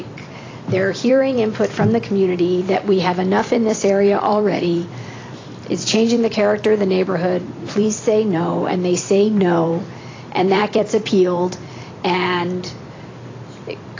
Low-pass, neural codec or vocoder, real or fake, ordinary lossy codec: 7.2 kHz; vocoder, 44.1 kHz, 128 mel bands every 256 samples, BigVGAN v2; fake; AAC, 32 kbps